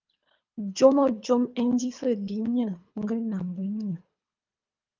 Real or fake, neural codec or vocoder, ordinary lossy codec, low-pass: fake; codec, 24 kHz, 3 kbps, HILCodec; Opus, 24 kbps; 7.2 kHz